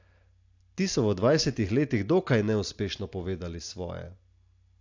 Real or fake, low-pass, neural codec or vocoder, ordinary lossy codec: real; 7.2 kHz; none; AAC, 48 kbps